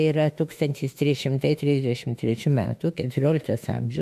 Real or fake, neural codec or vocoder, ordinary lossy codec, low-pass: fake; autoencoder, 48 kHz, 32 numbers a frame, DAC-VAE, trained on Japanese speech; MP3, 96 kbps; 14.4 kHz